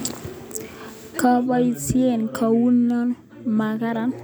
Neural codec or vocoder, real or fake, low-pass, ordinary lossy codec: none; real; none; none